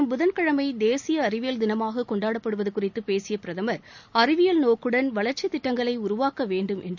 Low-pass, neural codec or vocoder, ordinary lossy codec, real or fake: 7.2 kHz; none; none; real